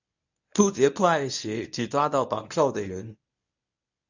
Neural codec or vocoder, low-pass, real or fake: codec, 24 kHz, 0.9 kbps, WavTokenizer, medium speech release version 1; 7.2 kHz; fake